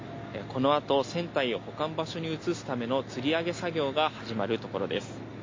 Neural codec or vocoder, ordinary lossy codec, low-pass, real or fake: none; MP3, 32 kbps; 7.2 kHz; real